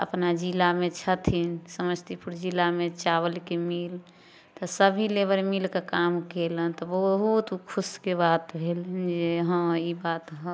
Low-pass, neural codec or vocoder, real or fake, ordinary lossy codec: none; none; real; none